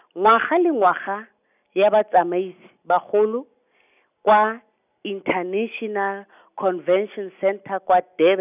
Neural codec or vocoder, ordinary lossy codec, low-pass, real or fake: none; none; 3.6 kHz; real